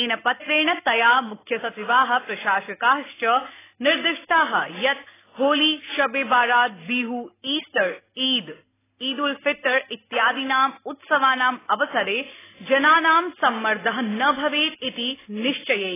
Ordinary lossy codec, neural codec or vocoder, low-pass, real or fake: AAC, 16 kbps; none; 3.6 kHz; real